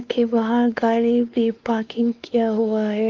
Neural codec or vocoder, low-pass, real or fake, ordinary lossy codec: codec, 16 kHz, 2 kbps, X-Codec, WavLM features, trained on Multilingual LibriSpeech; 7.2 kHz; fake; Opus, 32 kbps